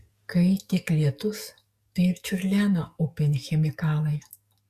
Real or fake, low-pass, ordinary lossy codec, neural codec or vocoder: fake; 14.4 kHz; Opus, 64 kbps; codec, 44.1 kHz, 7.8 kbps, DAC